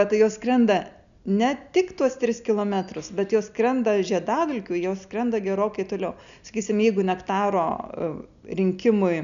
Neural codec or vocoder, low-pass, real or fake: none; 7.2 kHz; real